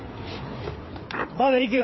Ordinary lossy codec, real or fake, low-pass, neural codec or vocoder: MP3, 24 kbps; fake; 7.2 kHz; codec, 16 kHz, 4 kbps, FreqCodec, larger model